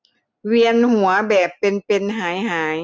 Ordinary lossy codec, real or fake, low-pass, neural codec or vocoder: none; real; none; none